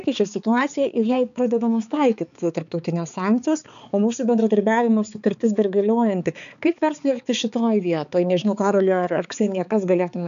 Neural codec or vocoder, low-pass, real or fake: codec, 16 kHz, 4 kbps, X-Codec, HuBERT features, trained on balanced general audio; 7.2 kHz; fake